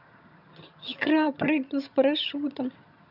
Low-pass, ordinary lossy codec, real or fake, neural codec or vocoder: 5.4 kHz; none; fake; vocoder, 22.05 kHz, 80 mel bands, HiFi-GAN